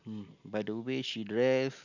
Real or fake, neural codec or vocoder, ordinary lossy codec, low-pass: real; none; none; 7.2 kHz